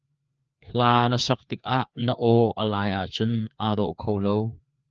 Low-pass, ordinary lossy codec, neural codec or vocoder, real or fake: 7.2 kHz; Opus, 24 kbps; codec, 16 kHz, 2 kbps, FreqCodec, larger model; fake